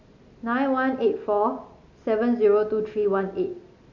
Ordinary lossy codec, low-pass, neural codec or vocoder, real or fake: AAC, 48 kbps; 7.2 kHz; none; real